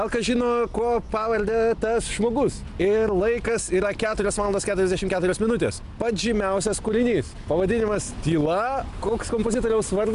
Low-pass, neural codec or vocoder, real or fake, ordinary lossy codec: 10.8 kHz; none; real; MP3, 96 kbps